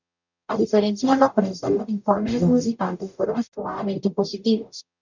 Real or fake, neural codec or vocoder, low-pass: fake; codec, 44.1 kHz, 0.9 kbps, DAC; 7.2 kHz